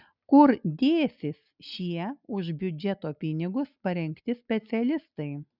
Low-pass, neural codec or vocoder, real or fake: 5.4 kHz; none; real